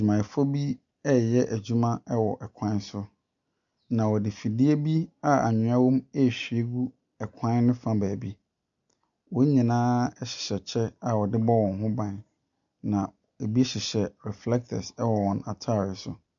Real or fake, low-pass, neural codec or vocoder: real; 7.2 kHz; none